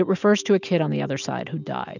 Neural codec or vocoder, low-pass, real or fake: none; 7.2 kHz; real